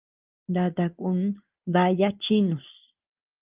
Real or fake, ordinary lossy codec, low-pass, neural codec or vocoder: real; Opus, 24 kbps; 3.6 kHz; none